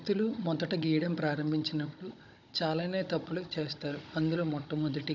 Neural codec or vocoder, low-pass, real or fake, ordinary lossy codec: codec, 16 kHz, 16 kbps, FunCodec, trained on Chinese and English, 50 frames a second; 7.2 kHz; fake; none